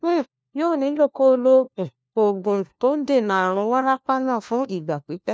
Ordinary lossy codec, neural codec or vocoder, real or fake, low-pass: none; codec, 16 kHz, 1 kbps, FunCodec, trained on LibriTTS, 50 frames a second; fake; none